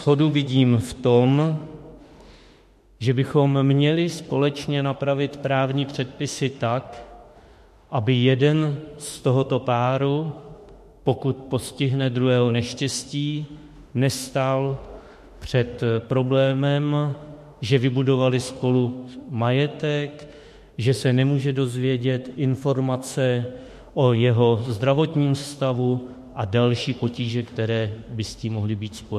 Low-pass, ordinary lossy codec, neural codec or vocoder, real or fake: 14.4 kHz; MP3, 64 kbps; autoencoder, 48 kHz, 32 numbers a frame, DAC-VAE, trained on Japanese speech; fake